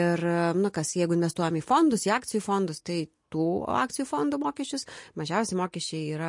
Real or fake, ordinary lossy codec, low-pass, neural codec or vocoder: real; MP3, 48 kbps; 10.8 kHz; none